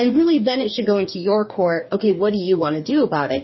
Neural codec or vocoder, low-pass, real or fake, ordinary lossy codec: codec, 44.1 kHz, 2.6 kbps, DAC; 7.2 kHz; fake; MP3, 24 kbps